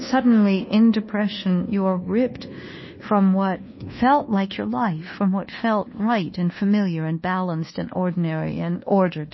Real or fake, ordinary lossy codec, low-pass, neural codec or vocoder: fake; MP3, 24 kbps; 7.2 kHz; codec, 24 kHz, 1.2 kbps, DualCodec